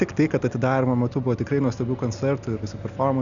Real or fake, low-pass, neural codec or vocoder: real; 7.2 kHz; none